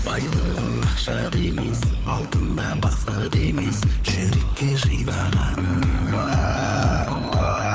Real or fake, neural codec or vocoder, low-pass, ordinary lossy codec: fake; codec, 16 kHz, 4 kbps, FunCodec, trained on LibriTTS, 50 frames a second; none; none